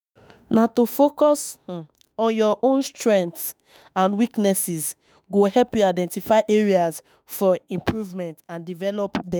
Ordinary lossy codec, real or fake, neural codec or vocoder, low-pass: none; fake; autoencoder, 48 kHz, 32 numbers a frame, DAC-VAE, trained on Japanese speech; none